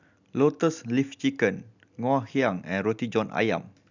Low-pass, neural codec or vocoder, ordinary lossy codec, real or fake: 7.2 kHz; none; none; real